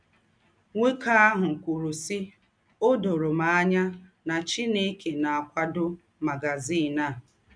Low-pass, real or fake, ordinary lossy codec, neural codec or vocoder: 9.9 kHz; real; none; none